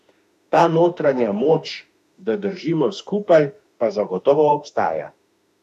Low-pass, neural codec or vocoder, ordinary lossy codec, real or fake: 14.4 kHz; autoencoder, 48 kHz, 32 numbers a frame, DAC-VAE, trained on Japanese speech; none; fake